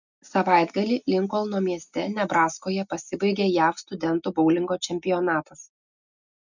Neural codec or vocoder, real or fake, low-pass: none; real; 7.2 kHz